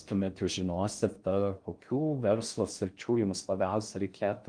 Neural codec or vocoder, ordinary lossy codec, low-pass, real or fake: codec, 16 kHz in and 24 kHz out, 0.6 kbps, FocalCodec, streaming, 4096 codes; Opus, 32 kbps; 9.9 kHz; fake